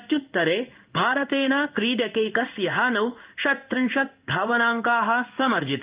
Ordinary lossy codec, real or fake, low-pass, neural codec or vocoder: Opus, 32 kbps; fake; 3.6 kHz; codec, 16 kHz in and 24 kHz out, 1 kbps, XY-Tokenizer